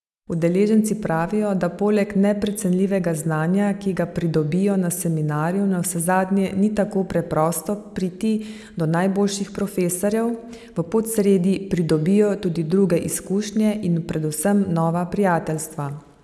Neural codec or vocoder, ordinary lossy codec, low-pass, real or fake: none; none; none; real